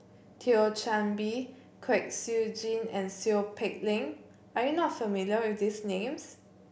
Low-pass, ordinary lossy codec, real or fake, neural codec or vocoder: none; none; real; none